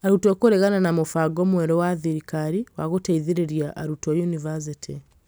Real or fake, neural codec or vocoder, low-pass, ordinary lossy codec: real; none; none; none